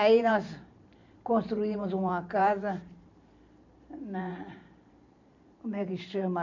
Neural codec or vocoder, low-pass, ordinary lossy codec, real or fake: vocoder, 44.1 kHz, 128 mel bands every 512 samples, BigVGAN v2; 7.2 kHz; none; fake